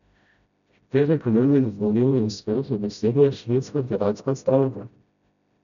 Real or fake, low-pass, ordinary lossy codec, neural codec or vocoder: fake; 7.2 kHz; none; codec, 16 kHz, 0.5 kbps, FreqCodec, smaller model